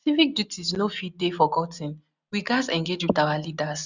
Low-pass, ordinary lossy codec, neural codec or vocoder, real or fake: 7.2 kHz; none; none; real